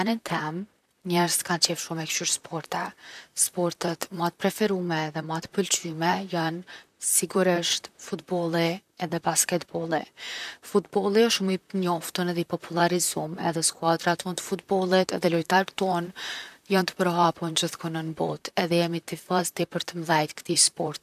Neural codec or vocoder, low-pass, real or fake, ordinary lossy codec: vocoder, 44.1 kHz, 128 mel bands, Pupu-Vocoder; 14.4 kHz; fake; none